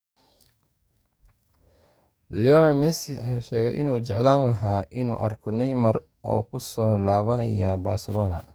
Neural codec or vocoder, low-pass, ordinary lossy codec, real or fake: codec, 44.1 kHz, 2.6 kbps, DAC; none; none; fake